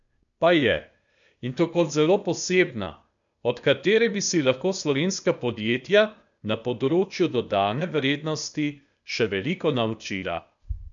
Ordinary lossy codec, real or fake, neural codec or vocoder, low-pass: none; fake; codec, 16 kHz, 0.8 kbps, ZipCodec; 7.2 kHz